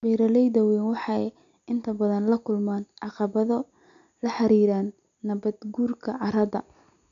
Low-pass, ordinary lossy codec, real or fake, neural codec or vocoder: 7.2 kHz; AAC, 96 kbps; real; none